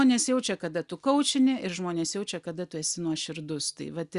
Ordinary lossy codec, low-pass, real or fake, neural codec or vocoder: Opus, 64 kbps; 10.8 kHz; real; none